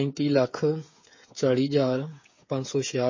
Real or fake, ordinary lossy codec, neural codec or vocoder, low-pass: fake; MP3, 32 kbps; codec, 16 kHz, 8 kbps, FreqCodec, smaller model; 7.2 kHz